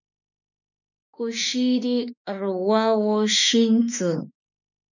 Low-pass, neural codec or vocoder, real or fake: 7.2 kHz; autoencoder, 48 kHz, 32 numbers a frame, DAC-VAE, trained on Japanese speech; fake